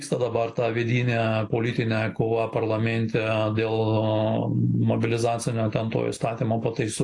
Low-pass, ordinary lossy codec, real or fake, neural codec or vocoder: 10.8 kHz; AAC, 48 kbps; real; none